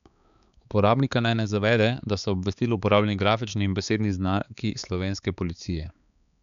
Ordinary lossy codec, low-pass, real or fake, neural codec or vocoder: none; 7.2 kHz; fake; codec, 16 kHz, 4 kbps, X-Codec, HuBERT features, trained on balanced general audio